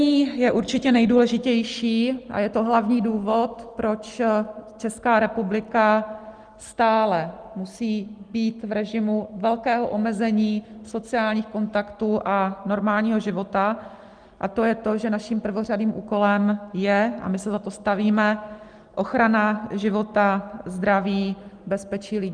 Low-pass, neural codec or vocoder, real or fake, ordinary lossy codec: 9.9 kHz; none; real; Opus, 24 kbps